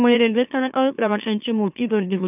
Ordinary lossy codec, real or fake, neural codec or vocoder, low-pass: none; fake; autoencoder, 44.1 kHz, a latent of 192 numbers a frame, MeloTTS; 3.6 kHz